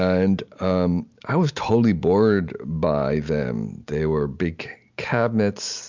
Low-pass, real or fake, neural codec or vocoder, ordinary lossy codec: 7.2 kHz; real; none; MP3, 64 kbps